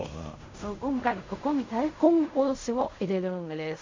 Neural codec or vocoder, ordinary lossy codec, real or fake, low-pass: codec, 16 kHz in and 24 kHz out, 0.4 kbps, LongCat-Audio-Codec, fine tuned four codebook decoder; MP3, 64 kbps; fake; 7.2 kHz